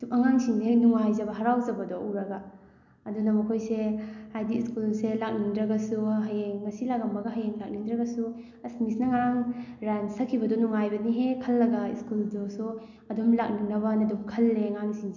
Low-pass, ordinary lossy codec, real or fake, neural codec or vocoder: 7.2 kHz; none; real; none